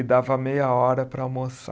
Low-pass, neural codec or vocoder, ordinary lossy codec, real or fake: none; none; none; real